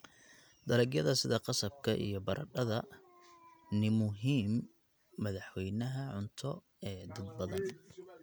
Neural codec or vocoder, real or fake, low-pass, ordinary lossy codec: none; real; none; none